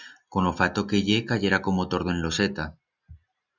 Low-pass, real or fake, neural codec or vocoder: 7.2 kHz; real; none